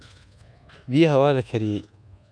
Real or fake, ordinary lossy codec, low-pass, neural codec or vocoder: fake; none; 9.9 kHz; codec, 24 kHz, 1.2 kbps, DualCodec